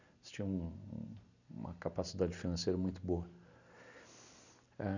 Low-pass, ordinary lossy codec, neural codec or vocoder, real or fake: 7.2 kHz; none; none; real